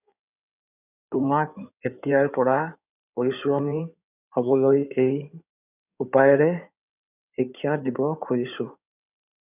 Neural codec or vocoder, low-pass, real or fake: codec, 16 kHz in and 24 kHz out, 2.2 kbps, FireRedTTS-2 codec; 3.6 kHz; fake